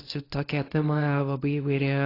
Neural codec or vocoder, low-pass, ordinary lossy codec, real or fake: codec, 24 kHz, 0.9 kbps, WavTokenizer, medium speech release version 1; 5.4 kHz; AAC, 24 kbps; fake